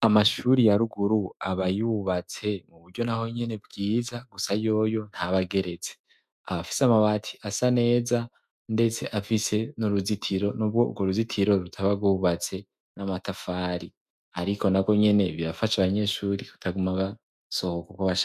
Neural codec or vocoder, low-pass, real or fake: autoencoder, 48 kHz, 128 numbers a frame, DAC-VAE, trained on Japanese speech; 14.4 kHz; fake